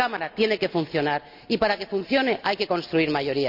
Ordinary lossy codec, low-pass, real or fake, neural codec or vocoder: none; 5.4 kHz; real; none